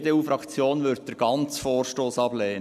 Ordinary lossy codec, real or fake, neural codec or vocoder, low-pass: none; real; none; 14.4 kHz